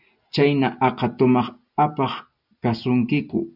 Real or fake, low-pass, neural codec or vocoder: real; 5.4 kHz; none